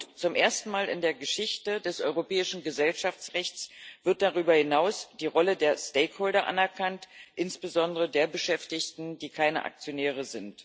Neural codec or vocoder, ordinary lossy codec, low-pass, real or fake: none; none; none; real